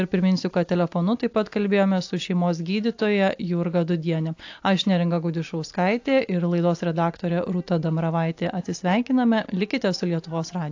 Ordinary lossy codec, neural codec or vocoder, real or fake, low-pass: AAC, 48 kbps; none; real; 7.2 kHz